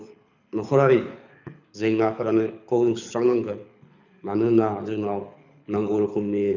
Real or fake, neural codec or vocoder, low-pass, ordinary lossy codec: fake; codec, 24 kHz, 6 kbps, HILCodec; 7.2 kHz; none